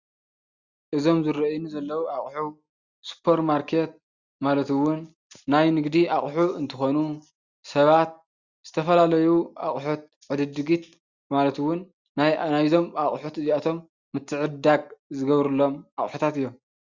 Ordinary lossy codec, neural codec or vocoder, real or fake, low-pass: Opus, 64 kbps; none; real; 7.2 kHz